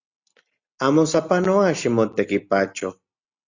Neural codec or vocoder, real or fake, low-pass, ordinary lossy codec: none; real; 7.2 kHz; Opus, 64 kbps